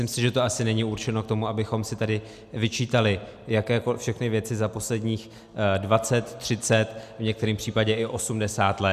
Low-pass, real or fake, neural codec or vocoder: 14.4 kHz; real; none